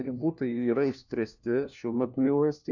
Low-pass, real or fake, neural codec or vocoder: 7.2 kHz; fake; codec, 16 kHz, 1 kbps, FunCodec, trained on LibriTTS, 50 frames a second